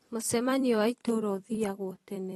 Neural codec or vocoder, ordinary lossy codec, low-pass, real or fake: vocoder, 44.1 kHz, 128 mel bands every 256 samples, BigVGAN v2; AAC, 32 kbps; 19.8 kHz; fake